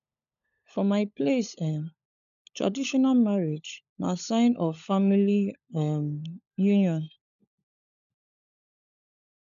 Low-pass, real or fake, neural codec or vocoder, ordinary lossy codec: 7.2 kHz; fake; codec, 16 kHz, 16 kbps, FunCodec, trained on LibriTTS, 50 frames a second; none